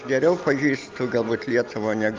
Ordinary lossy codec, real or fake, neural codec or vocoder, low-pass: Opus, 24 kbps; real; none; 7.2 kHz